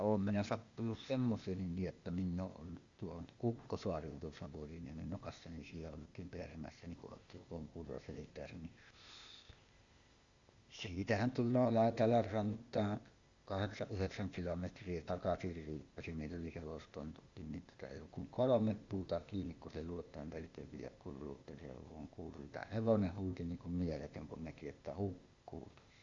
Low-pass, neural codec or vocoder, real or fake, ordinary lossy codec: 7.2 kHz; codec, 16 kHz, 0.8 kbps, ZipCodec; fake; Opus, 64 kbps